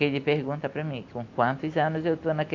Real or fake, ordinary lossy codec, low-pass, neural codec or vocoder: real; AAC, 48 kbps; 7.2 kHz; none